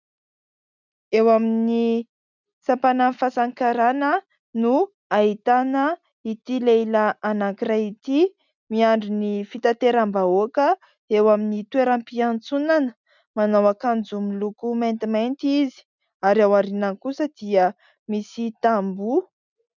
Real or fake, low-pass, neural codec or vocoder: real; 7.2 kHz; none